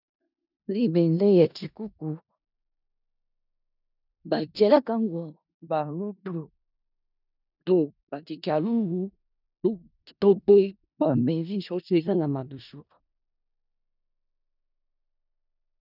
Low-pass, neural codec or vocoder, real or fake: 5.4 kHz; codec, 16 kHz in and 24 kHz out, 0.4 kbps, LongCat-Audio-Codec, four codebook decoder; fake